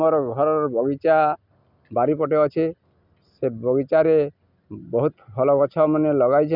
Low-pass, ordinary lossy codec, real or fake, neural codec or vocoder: 5.4 kHz; none; real; none